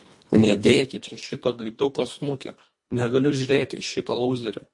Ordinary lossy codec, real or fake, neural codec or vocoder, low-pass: MP3, 48 kbps; fake; codec, 24 kHz, 1.5 kbps, HILCodec; 10.8 kHz